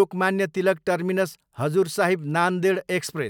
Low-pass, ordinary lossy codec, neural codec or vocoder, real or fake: 19.8 kHz; none; none; real